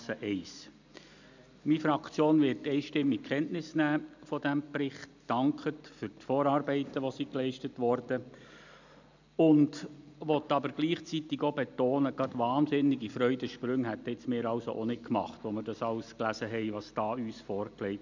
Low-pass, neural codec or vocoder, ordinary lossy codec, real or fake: 7.2 kHz; none; none; real